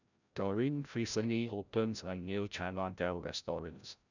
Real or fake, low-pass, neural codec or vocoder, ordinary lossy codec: fake; 7.2 kHz; codec, 16 kHz, 0.5 kbps, FreqCodec, larger model; none